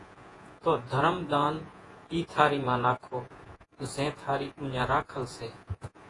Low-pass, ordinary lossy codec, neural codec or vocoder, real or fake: 10.8 kHz; AAC, 32 kbps; vocoder, 48 kHz, 128 mel bands, Vocos; fake